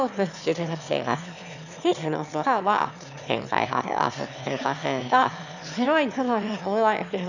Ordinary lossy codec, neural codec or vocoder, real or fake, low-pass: none; autoencoder, 22.05 kHz, a latent of 192 numbers a frame, VITS, trained on one speaker; fake; 7.2 kHz